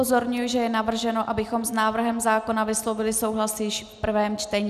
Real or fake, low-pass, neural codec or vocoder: real; 14.4 kHz; none